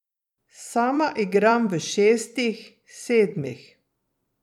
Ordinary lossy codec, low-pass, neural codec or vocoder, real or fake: none; 19.8 kHz; vocoder, 44.1 kHz, 128 mel bands every 256 samples, BigVGAN v2; fake